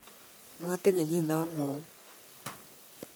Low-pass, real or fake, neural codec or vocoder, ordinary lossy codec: none; fake; codec, 44.1 kHz, 1.7 kbps, Pupu-Codec; none